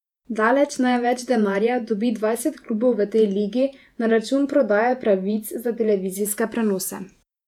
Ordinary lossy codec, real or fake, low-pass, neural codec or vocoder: none; fake; 19.8 kHz; vocoder, 48 kHz, 128 mel bands, Vocos